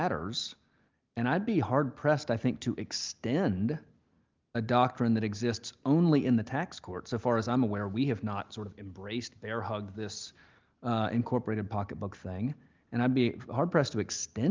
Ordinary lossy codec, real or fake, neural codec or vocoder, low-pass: Opus, 24 kbps; real; none; 7.2 kHz